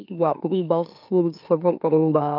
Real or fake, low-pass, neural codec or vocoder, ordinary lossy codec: fake; 5.4 kHz; autoencoder, 44.1 kHz, a latent of 192 numbers a frame, MeloTTS; none